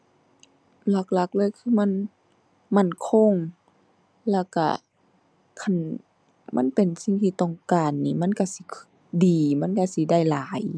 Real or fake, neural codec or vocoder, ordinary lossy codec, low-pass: real; none; none; none